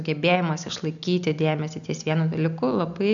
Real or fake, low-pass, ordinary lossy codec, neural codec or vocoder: real; 7.2 kHz; MP3, 96 kbps; none